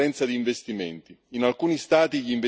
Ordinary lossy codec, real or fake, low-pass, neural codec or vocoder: none; real; none; none